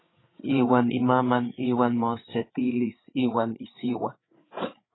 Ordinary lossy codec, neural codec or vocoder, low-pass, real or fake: AAC, 16 kbps; codec, 16 kHz, 8 kbps, FreqCodec, larger model; 7.2 kHz; fake